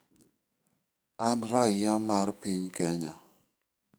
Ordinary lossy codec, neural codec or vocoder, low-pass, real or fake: none; codec, 44.1 kHz, 2.6 kbps, SNAC; none; fake